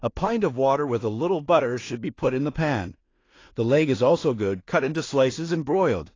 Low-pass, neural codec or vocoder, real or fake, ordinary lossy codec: 7.2 kHz; codec, 16 kHz in and 24 kHz out, 0.4 kbps, LongCat-Audio-Codec, two codebook decoder; fake; AAC, 32 kbps